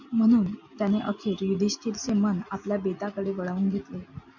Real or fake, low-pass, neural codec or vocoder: real; 7.2 kHz; none